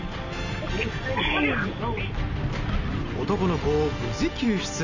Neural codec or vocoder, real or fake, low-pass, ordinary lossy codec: none; real; 7.2 kHz; none